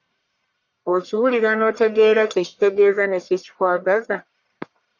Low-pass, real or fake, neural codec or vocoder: 7.2 kHz; fake; codec, 44.1 kHz, 1.7 kbps, Pupu-Codec